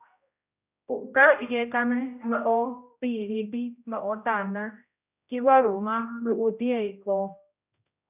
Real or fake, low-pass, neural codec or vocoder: fake; 3.6 kHz; codec, 16 kHz, 0.5 kbps, X-Codec, HuBERT features, trained on general audio